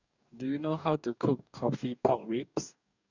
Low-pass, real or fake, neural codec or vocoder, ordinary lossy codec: 7.2 kHz; fake; codec, 44.1 kHz, 2.6 kbps, DAC; none